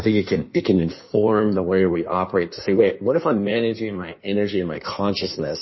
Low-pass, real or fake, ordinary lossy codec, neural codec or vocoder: 7.2 kHz; fake; MP3, 24 kbps; codec, 16 kHz in and 24 kHz out, 1.1 kbps, FireRedTTS-2 codec